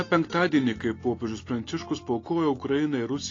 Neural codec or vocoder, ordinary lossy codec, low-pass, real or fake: none; AAC, 32 kbps; 7.2 kHz; real